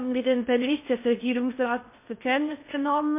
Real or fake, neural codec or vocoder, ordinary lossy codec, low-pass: fake; codec, 16 kHz in and 24 kHz out, 0.6 kbps, FocalCodec, streaming, 4096 codes; MP3, 24 kbps; 3.6 kHz